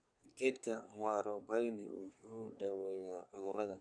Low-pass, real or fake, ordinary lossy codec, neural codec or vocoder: 9.9 kHz; fake; none; codec, 24 kHz, 1 kbps, SNAC